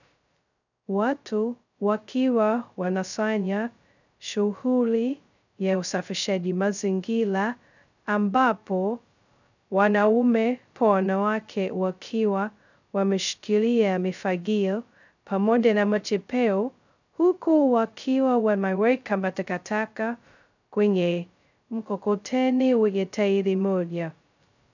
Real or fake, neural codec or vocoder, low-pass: fake; codec, 16 kHz, 0.2 kbps, FocalCodec; 7.2 kHz